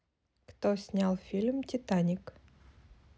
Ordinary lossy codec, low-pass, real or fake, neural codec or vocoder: none; none; real; none